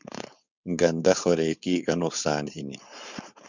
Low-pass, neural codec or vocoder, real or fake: 7.2 kHz; codec, 16 kHz, 4.8 kbps, FACodec; fake